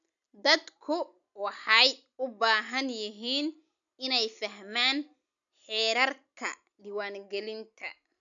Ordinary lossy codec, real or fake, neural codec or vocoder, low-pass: none; real; none; 7.2 kHz